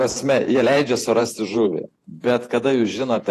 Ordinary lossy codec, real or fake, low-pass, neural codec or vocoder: AAC, 48 kbps; fake; 14.4 kHz; vocoder, 48 kHz, 128 mel bands, Vocos